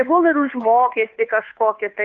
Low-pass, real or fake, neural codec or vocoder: 7.2 kHz; fake; codec, 16 kHz, 2 kbps, FunCodec, trained on Chinese and English, 25 frames a second